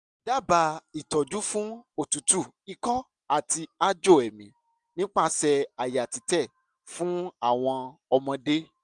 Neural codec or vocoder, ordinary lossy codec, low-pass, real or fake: none; none; 10.8 kHz; real